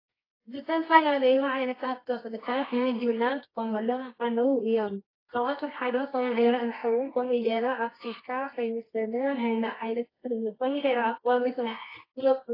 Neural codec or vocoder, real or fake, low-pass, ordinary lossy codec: codec, 24 kHz, 0.9 kbps, WavTokenizer, medium music audio release; fake; 5.4 kHz; AAC, 24 kbps